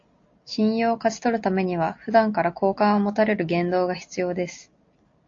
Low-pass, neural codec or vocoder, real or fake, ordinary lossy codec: 7.2 kHz; none; real; AAC, 48 kbps